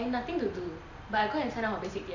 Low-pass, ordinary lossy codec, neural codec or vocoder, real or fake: 7.2 kHz; none; none; real